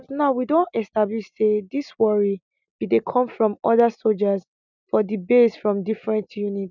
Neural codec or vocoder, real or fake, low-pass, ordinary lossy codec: none; real; 7.2 kHz; none